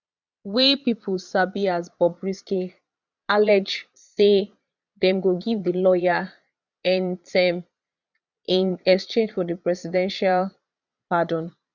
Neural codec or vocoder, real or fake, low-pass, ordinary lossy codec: vocoder, 22.05 kHz, 80 mel bands, Vocos; fake; 7.2 kHz; none